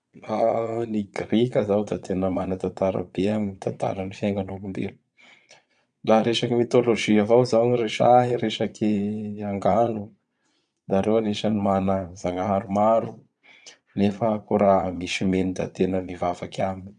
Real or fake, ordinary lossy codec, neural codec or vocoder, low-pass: fake; none; vocoder, 22.05 kHz, 80 mel bands, Vocos; 9.9 kHz